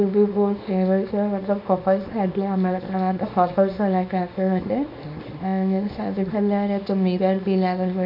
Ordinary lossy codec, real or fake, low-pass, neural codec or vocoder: AAC, 48 kbps; fake; 5.4 kHz; codec, 24 kHz, 0.9 kbps, WavTokenizer, small release